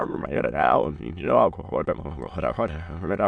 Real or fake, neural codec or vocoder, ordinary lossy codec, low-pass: fake; autoencoder, 22.05 kHz, a latent of 192 numbers a frame, VITS, trained on many speakers; MP3, 96 kbps; 9.9 kHz